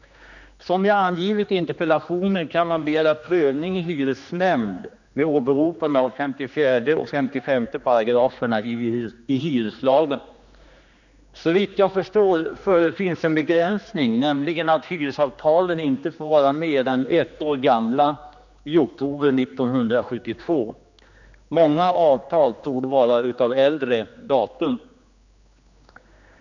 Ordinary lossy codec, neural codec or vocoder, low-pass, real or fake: none; codec, 16 kHz, 2 kbps, X-Codec, HuBERT features, trained on general audio; 7.2 kHz; fake